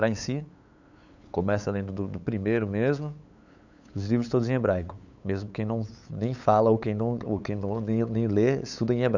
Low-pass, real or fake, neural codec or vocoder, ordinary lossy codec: 7.2 kHz; fake; codec, 16 kHz, 8 kbps, FunCodec, trained on LibriTTS, 25 frames a second; none